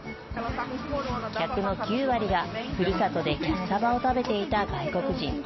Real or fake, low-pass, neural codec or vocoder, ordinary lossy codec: real; 7.2 kHz; none; MP3, 24 kbps